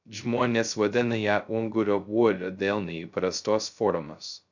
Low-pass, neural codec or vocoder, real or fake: 7.2 kHz; codec, 16 kHz, 0.2 kbps, FocalCodec; fake